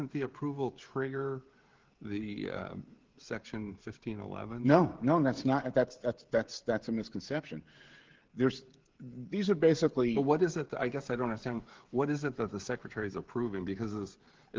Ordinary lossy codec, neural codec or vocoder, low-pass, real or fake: Opus, 16 kbps; codec, 16 kHz, 16 kbps, FreqCodec, smaller model; 7.2 kHz; fake